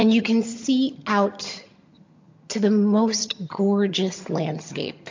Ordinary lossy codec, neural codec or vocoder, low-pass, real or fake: MP3, 48 kbps; vocoder, 22.05 kHz, 80 mel bands, HiFi-GAN; 7.2 kHz; fake